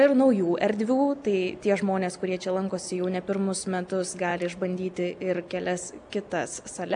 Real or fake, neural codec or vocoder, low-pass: real; none; 9.9 kHz